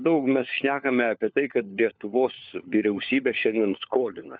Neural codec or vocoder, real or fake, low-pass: codec, 16 kHz, 4 kbps, FunCodec, trained on LibriTTS, 50 frames a second; fake; 7.2 kHz